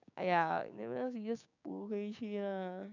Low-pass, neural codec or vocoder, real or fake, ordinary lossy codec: 7.2 kHz; codec, 16 kHz, 6 kbps, DAC; fake; none